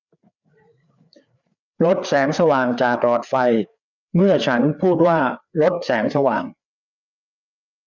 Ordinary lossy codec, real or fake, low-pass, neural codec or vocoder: none; fake; 7.2 kHz; codec, 16 kHz, 4 kbps, FreqCodec, larger model